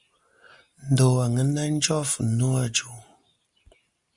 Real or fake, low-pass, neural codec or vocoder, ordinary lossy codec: real; 10.8 kHz; none; Opus, 64 kbps